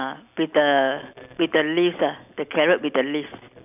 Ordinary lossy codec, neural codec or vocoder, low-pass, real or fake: none; none; 3.6 kHz; real